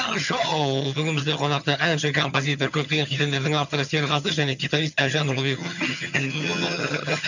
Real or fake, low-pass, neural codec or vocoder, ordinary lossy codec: fake; 7.2 kHz; vocoder, 22.05 kHz, 80 mel bands, HiFi-GAN; none